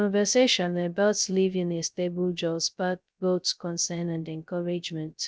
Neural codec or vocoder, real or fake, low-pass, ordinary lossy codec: codec, 16 kHz, 0.2 kbps, FocalCodec; fake; none; none